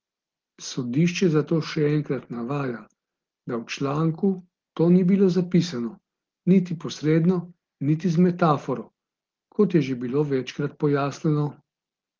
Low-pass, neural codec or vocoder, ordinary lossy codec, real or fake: 7.2 kHz; none; Opus, 16 kbps; real